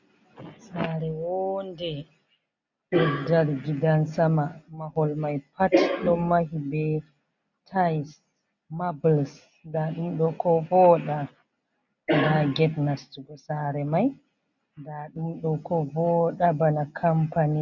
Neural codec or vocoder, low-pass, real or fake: none; 7.2 kHz; real